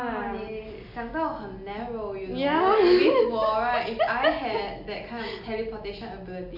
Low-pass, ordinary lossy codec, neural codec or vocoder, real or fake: 5.4 kHz; none; none; real